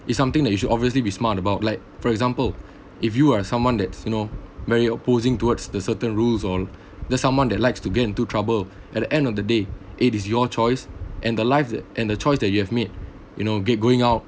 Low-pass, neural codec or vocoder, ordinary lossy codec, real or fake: none; none; none; real